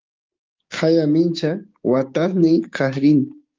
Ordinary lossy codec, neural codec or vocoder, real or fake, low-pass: Opus, 24 kbps; codec, 16 kHz, 6 kbps, DAC; fake; 7.2 kHz